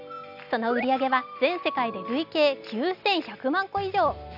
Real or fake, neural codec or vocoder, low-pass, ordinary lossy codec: real; none; 5.4 kHz; none